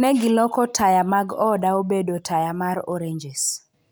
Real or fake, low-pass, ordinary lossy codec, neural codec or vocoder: real; none; none; none